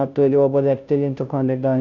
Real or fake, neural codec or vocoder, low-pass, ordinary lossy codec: fake; codec, 16 kHz, 0.5 kbps, FunCodec, trained on Chinese and English, 25 frames a second; 7.2 kHz; none